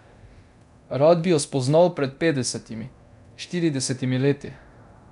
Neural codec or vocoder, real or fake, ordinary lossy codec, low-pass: codec, 24 kHz, 0.9 kbps, DualCodec; fake; none; 10.8 kHz